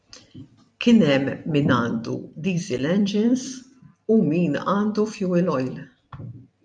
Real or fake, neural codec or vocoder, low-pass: fake; vocoder, 44.1 kHz, 128 mel bands every 256 samples, BigVGAN v2; 9.9 kHz